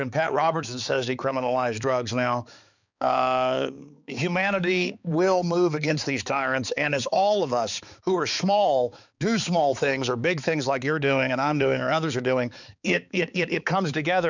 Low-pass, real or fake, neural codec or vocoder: 7.2 kHz; fake; codec, 16 kHz, 4 kbps, X-Codec, HuBERT features, trained on balanced general audio